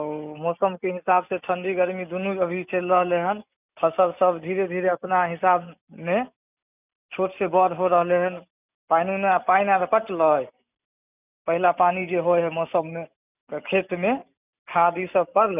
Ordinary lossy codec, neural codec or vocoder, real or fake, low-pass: none; none; real; 3.6 kHz